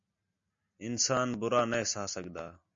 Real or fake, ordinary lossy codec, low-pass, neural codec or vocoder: real; MP3, 48 kbps; 7.2 kHz; none